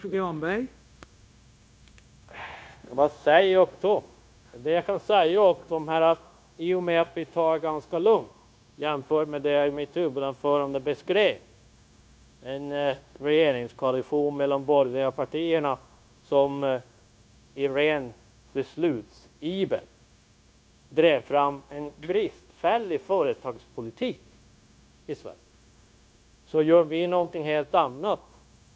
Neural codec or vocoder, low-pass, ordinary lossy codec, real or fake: codec, 16 kHz, 0.9 kbps, LongCat-Audio-Codec; none; none; fake